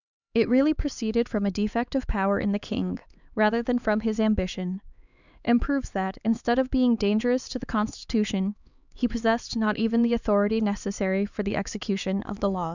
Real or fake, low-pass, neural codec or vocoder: fake; 7.2 kHz; codec, 16 kHz, 4 kbps, X-Codec, HuBERT features, trained on LibriSpeech